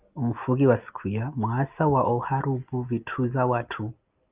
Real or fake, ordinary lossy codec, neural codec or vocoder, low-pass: real; Opus, 32 kbps; none; 3.6 kHz